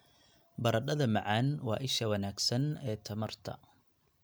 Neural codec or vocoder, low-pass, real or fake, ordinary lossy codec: none; none; real; none